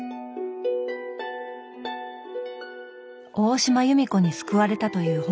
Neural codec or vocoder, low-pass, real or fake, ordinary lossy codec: none; none; real; none